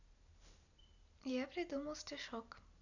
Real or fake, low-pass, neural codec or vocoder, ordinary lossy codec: real; 7.2 kHz; none; none